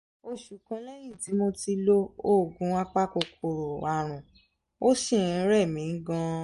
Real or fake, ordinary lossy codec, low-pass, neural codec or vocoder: real; MP3, 48 kbps; 14.4 kHz; none